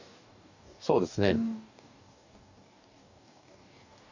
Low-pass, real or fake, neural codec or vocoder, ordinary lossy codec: 7.2 kHz; fake; codec, 44.1 kHz, 2.6 kbps, DAC; none